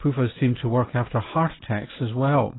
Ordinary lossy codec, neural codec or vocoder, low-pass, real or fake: AAC, 16 kbps; none; 7.2 kHz; real